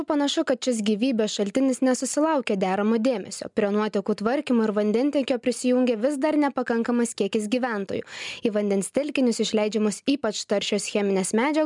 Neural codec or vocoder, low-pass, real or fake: none; 10.8 kHz; real